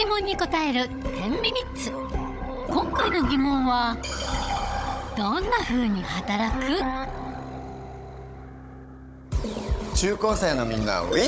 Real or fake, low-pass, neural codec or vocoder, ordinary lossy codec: fake; none; codec, 16 kHz, 16 kbps, FunCodec, trained on Chinese and English, 50 frames a second; none